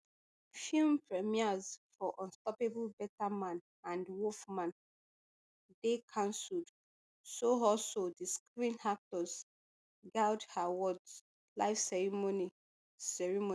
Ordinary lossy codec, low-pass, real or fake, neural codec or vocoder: none; none; real; none